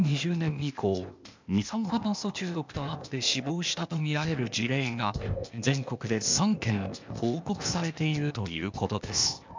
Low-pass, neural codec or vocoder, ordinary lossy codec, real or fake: 7.2 kHz; codec, 16 kHz, 0.8 kbps, ZipCodec; MP3, 64 kbps; fake